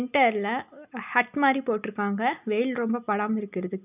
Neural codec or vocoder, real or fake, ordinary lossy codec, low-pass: none; real; none; 3.6 kHz